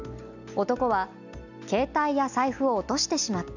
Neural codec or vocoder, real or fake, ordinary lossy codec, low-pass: none; real; none; 7.2 kHz